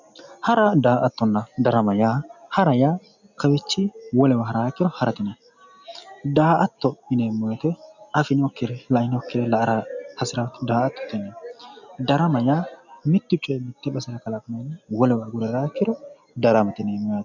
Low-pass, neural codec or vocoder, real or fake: 7.2 kHz; none; real